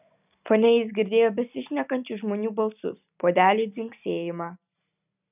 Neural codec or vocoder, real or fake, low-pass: none; real; 3.6 kHz